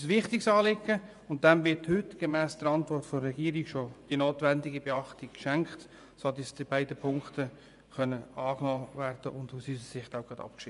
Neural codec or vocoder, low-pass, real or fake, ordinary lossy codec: vocoder, 24 kHz, 100 mel bands, Vocos; 10.8 kHz; fake; none